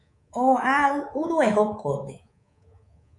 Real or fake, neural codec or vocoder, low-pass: fake; codec, 24 kHz, 3.1 kbps, DualCodec; 10.8 kHz